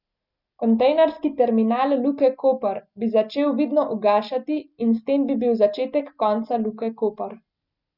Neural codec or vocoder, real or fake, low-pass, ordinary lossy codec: none; real; 5.4 kHz; none